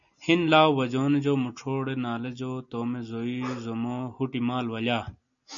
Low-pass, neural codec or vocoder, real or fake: 7.2 kHz; none; real